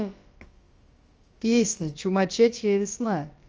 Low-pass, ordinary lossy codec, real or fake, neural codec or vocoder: 7.2 kHz; Opus, 24 kbps; fake; codec, 16 kHz, about 1 kbps, DyCAST, with the encoder's durations